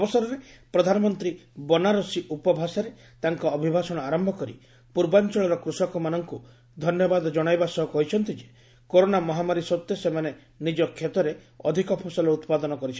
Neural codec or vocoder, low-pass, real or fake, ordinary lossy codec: none; none; real; none